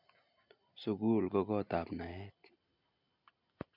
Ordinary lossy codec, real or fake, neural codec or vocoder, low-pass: none; real; none; 5.4 kHz